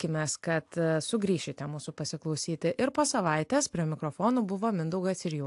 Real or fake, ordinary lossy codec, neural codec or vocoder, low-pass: real; AAC, 64 kbps; none; 10.8 kHz